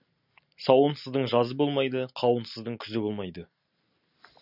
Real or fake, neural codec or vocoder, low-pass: real; none; 5.4 kHz